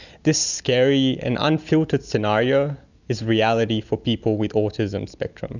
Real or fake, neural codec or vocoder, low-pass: real; none; 7.2 kHz